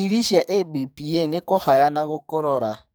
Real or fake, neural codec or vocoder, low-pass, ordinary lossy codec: fake; codec, 44.1 kHz, 2.6 kbps, SNAC; none; none